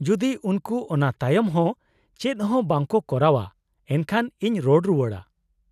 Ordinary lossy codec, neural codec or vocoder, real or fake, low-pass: none; none; real; 14.4 kHz